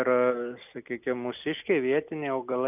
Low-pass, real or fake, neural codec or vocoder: 3.6 kHz; real; none